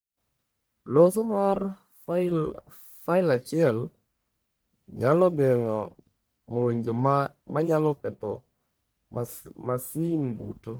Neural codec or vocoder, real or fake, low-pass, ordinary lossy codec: codec, 44.1 kHz, 1.7 kbps, Pupu-Codec; fake; none; none